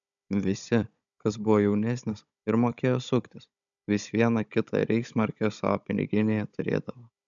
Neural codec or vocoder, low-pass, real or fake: codec, 16 kHz, 16 kbps, FunCodec, trained on Chinese and English, 50 frames a second; 7.2 kHz; fake